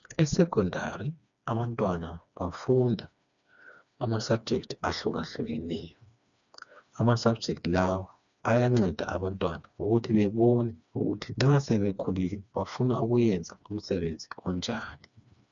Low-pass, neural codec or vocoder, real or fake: 7.2 kHz; codec, 16 kHz, 2 kbps, FreqCodec, smaller model; fake